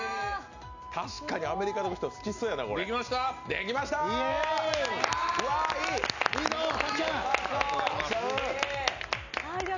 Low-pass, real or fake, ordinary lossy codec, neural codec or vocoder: 7.2 kHz; real; none; none